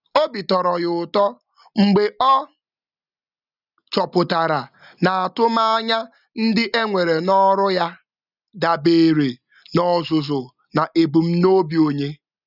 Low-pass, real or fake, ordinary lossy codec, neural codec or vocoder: 5.4 kHz; real; none; none